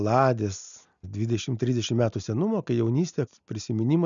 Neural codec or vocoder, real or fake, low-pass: none; real; 7.2 kHz